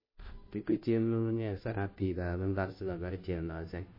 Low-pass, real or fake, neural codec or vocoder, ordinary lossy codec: 5.4 kHz; fake; codec, 16 kHz, 0.5 kbps, FunCodec, trained on Chinese and English, 25 frames a second; MP3, 24 kbps